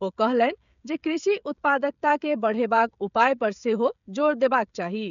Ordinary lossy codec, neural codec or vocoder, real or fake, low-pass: none; codec, 16 kHz, 16 kbps, FreqCodec, smaller model; fake; 7.2 kHz